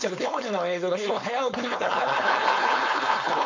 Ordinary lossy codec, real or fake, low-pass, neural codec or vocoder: MP3, 48 kbps; fake; 7.2 kHz; codec, 16 kHz, 4.8 kbps, FACodec